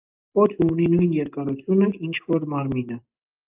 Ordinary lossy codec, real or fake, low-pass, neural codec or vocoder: Opus, 32 kbps; real; 3.6 kHz; none